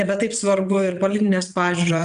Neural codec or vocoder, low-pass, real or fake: vocoder, 22.05 kHz, 80 mel bands, WaveNeXt; 9.9 kHz; fake